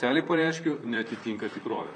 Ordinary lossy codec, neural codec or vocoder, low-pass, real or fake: MP3, 96 kbps; vocoder, 44.1 kHz, 128 mel bands, Pupu-Vocoder; 9.9 kHz; fake